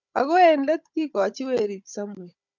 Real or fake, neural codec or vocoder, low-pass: fake; codec, 16 kHz, 16 kbps, FunCodec, trained on Chinese and English, 50 frames a second; 7.2 kHz